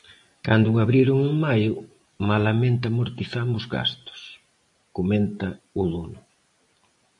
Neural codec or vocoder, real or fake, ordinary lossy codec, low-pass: none; real; AAC, 64 kbps; 10.8 kHz